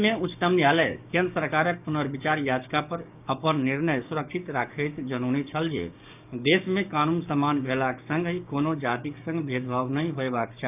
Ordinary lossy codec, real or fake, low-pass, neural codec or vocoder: none; fake; 3.6 kHz; codec, 16 kHz, 6 kbps, DAC